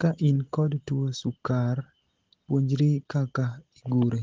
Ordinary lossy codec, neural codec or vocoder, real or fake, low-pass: Opus, 16 kbps; none; real; 7.2 kHz